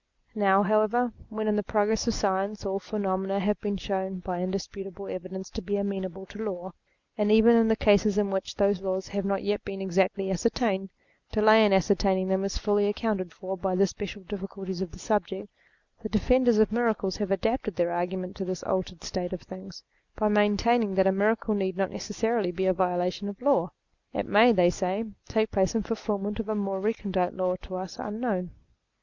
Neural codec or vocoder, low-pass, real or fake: none; 7.2 kHz; real